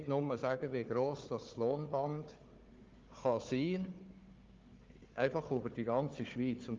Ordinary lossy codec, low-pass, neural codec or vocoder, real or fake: Opus, 32 kbps; 7.2 kHz; codec, 16 kHz, 4 kbps, FunCodec, trained on Chinese and English, 50 frames a second; fake